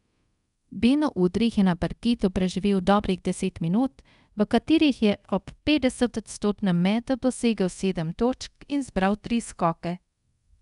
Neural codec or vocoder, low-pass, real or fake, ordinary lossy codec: codec, 24 kHz, 0.5 kbps, DualCodec; 10.8 kHz; fake; none